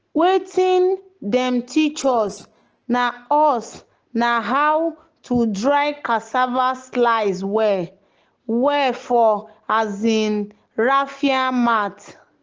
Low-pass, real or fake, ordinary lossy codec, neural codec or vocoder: 7.2 kHz; real; Opus, 16 kbps; none